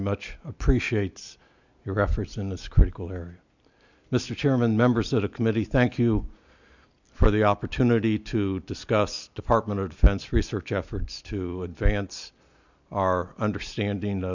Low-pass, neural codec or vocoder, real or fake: 7.2 kHz; none; real